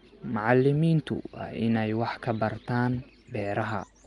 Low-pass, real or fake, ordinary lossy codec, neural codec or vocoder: 10.8 kHz; real; Opus, 32 kbps; none